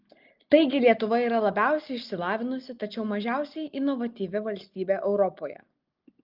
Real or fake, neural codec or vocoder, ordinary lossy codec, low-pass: real; none; Opus, 32 kbps; 5.4 kHz